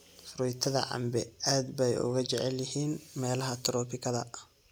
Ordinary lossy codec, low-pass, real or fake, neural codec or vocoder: none; none; real; none